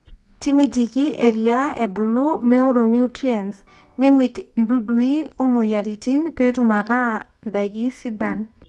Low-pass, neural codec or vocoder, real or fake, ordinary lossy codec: none; codec, 24 kHz, 0.9 kbps, WavTokenizer, medium music audio release; fake; none